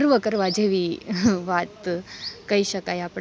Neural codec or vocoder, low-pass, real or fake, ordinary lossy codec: none; none; real; none